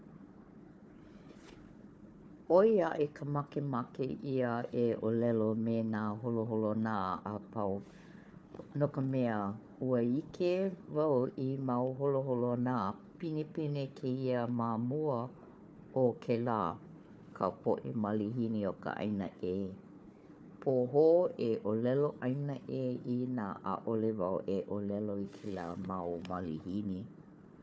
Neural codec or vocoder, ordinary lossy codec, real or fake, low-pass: codec, 16 kHz, 4 kbps, FunCodec, trained on Chinese and English, 50 frames a second; none; fake; none